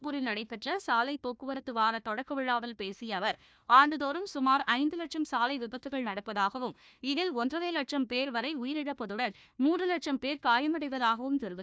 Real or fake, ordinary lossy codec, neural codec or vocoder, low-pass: fake; none; codec, 16 kHz, 1 kbps, FunCodec, trained on Chinese and English, 50 frames a second; none